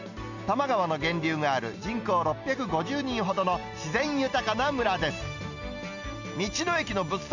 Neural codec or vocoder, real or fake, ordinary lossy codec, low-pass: none; real; none; 7.2 kHz